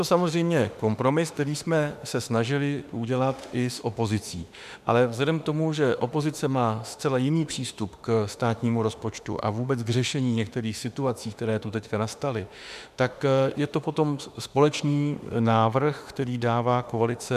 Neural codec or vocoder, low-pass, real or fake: autoencoder, 48 kHz, 32 numbers a frame, DAC-VAE, trained on Japanese speech; 14.4 kHz; fake